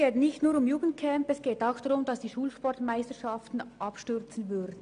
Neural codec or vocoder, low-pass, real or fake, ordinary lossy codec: none; 9.9 kHz; real; AAC, 96 kbps